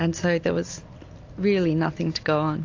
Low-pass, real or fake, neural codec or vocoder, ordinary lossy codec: 7.2 kHz; real; none; AAC, 48 kbps